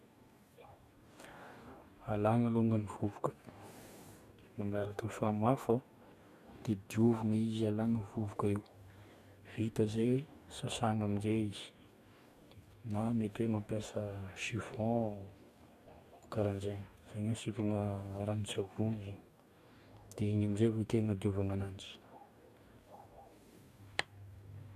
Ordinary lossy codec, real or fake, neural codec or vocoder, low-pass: none; fake; codec, 44.1 kHz, 2.6 kbps, DAC; 14.4 kHz